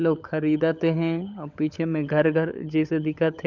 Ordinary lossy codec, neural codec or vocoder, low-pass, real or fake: none; codec, 16 kHz, 16 kbps, FunCodec, trained on LibriTTS, 50 frames a second; 7.2 kHz; fake